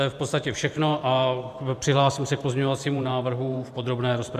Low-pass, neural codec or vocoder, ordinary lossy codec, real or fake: 14.4 kHz; vocoder, 44.1 kHz, 128 mel bands every 512 samples, BigVGAN v2; MP3, 96 kbps; fake